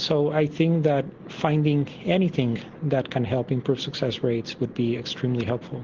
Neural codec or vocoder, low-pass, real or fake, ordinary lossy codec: none; 7.2 kHz; real; Opus, 16 kbps